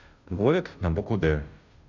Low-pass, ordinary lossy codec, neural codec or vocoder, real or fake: 7.2 kHz; none; codec, 16 kHz, 0.5 kbps, FunCodec, trained on Chinese and English, 25 frames a second; fake